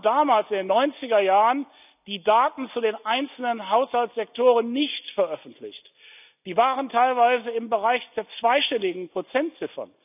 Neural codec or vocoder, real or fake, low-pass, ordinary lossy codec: none; real; 3.6 kHz; none